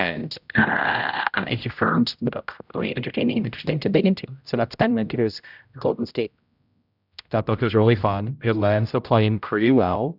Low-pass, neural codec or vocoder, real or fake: 5.4 kHz; codec, 16 kHz, 0.5 kbps, X-Codec, HuBERT features, trained on general audio; fake